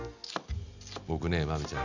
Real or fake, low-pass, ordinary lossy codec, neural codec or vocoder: real; 7.2 kHz; none; none